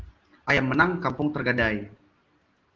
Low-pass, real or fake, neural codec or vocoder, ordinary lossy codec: 7.2 kHz; real; none; Opus, 16 kbps